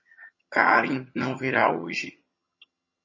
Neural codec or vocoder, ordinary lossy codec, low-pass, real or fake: vocoder, 22.05 kHz, 80 mel bands, HiFi-GAN; MP3, 32 kbps; 7.2 kHz; fake